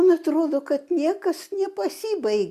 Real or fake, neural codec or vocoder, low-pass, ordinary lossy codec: real; none; 14.4 kHz; Opus, 64 kbps